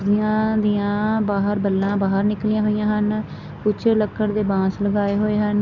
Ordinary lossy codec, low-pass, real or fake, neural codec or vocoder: none; 7.2 kHz; real; none